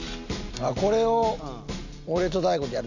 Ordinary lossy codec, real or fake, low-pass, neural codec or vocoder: none; real; 7.2 kHz; none